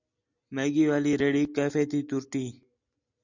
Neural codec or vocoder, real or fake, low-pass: none; real; 7.2 kHz